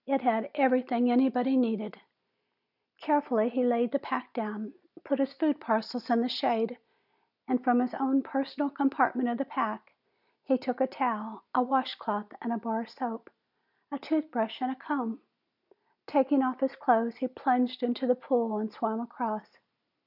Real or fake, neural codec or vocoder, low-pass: real; none; 5.4 kHz